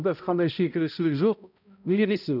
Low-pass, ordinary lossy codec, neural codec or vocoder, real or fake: 5.4 kHz; none; codec, 16 kHz, 0.5 kbps, X-Codec, HuBERT features, trained on balanced general audio; fake